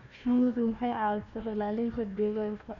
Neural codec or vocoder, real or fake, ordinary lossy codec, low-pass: codec, 16 kHz, 1 kbps, FunCodec, trained on Chinese and English, 50 frames a second; fake; none; 7.2 kHz